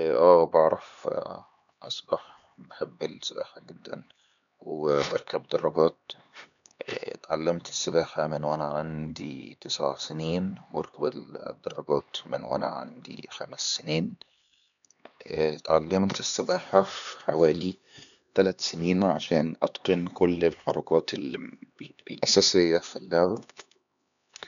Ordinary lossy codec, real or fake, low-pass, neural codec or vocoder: none; fake; 7.2 kHz; codec, 16 kHz, 2 kbps, X-Codec, HuBERT features, trained on LibriSpeech